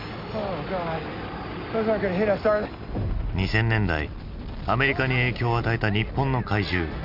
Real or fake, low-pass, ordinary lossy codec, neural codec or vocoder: real; 5.4 kHz; none; none